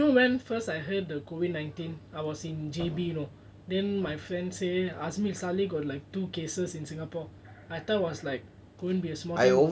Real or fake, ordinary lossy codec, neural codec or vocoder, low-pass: real; none; none; none